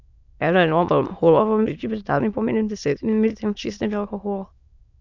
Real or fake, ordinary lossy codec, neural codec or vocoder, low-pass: fake; none; autoencoder, 22.05 kHz, a latent of 192 numbers a frame, VITS, trained on many speakers; 7.2 kHz